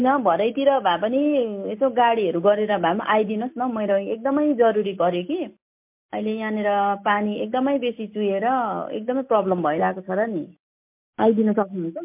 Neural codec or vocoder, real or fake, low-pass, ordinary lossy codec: none; real; 3.6 kHz; MP3, 32 kbps